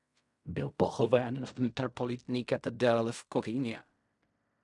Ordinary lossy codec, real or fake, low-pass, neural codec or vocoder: MP3, 96 kbps; fake; 10.8 kHz; codec, 16 kHz in and 24 kHz out, 0.4 kbps, LongCat-Audio-Codec, fine tuned four codebook decoder